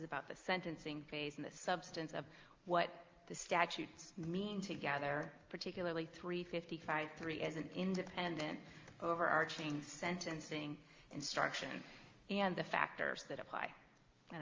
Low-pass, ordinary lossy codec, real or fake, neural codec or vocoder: 7.2 kHz; Opus, 32 kbps; real; none